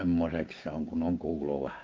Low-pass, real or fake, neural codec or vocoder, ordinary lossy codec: 7.2 kHz; fake; codec, 16 kHz, 6 kbps, DAC; Opus, 24 kbps